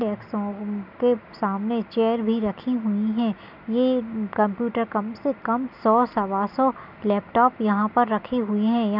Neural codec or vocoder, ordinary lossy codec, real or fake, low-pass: none; none; real; 5.4 kHz